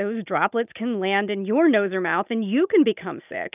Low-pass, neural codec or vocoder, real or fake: 3.6 kHz; none; real